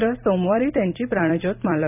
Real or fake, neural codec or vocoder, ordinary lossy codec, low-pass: fake; vocoder, 44.1 kHz, 128 mel bands every 256 samples, BigVGAN v2; none; 3.6 kHz